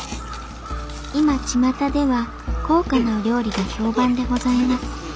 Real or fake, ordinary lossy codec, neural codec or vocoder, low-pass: real; none; none; none